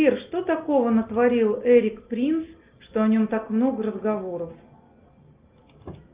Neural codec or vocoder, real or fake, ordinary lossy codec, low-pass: none; real; Opus, 32 kbps; 3.6 kHz